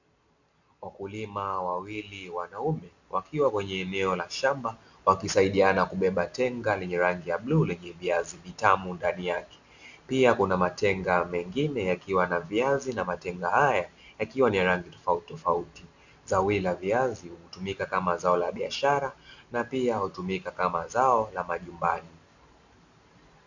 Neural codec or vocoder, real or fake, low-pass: none; real; 7.2 kHz